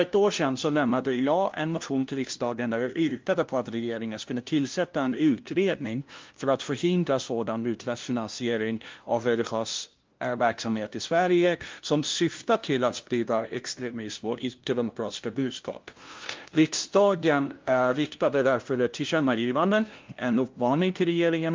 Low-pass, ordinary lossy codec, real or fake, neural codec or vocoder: 7.2 kHz; Opus, 32 kbps; fake; codec, 16 kHz, 0.5 kbps, FunCodec, trained on LibriTTS, 25 frames a second